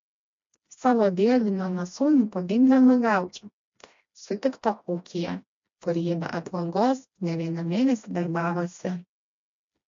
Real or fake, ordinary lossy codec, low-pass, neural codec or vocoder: fake; MP3, 48 kbps; 7.2 kHz; codec, 16 kHz, 1 kbps, FreqCodec, smaller model